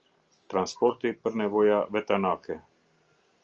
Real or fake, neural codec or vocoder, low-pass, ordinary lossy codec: real; none; 7.2 kHz; Opus, 24 kbps